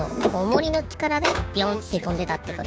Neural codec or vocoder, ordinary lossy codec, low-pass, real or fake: codec, 16 kHz, 6 kbps, DAC; none; none; fake